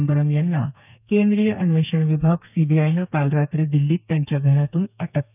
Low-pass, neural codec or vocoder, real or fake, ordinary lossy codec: 3.6 kHz; codec, 32 kHz, 1.9 kbps, SNAC; fake; none